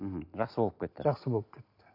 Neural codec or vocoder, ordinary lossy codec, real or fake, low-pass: none; AAC, 32 kbps; real; 5.4 kHz